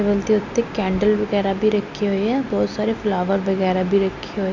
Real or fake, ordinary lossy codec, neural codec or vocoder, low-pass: real; none; none; 7.2 kHz